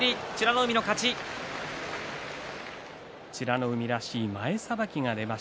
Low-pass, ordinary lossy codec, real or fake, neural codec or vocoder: none; none; real; none